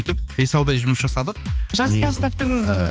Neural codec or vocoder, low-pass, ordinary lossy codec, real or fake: codec, 16 kHz, 2 kbps, X-Codec, HuBERT features, trained on balanced general audio; none; none; fake